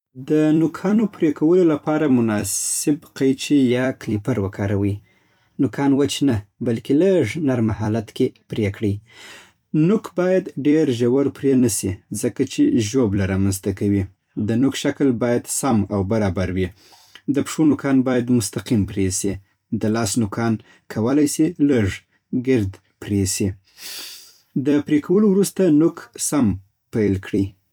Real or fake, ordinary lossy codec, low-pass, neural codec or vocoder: fake; none; 19.8 kHz; vocoder, 44.1 kHz, 128 mel bands every 256 samples, BigVGAN v2